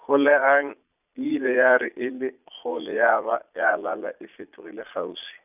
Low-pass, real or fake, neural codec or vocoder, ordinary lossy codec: 3.6 kHz; fake; vocoder, 22.05 kHz, 80 mel bands, Vocos; none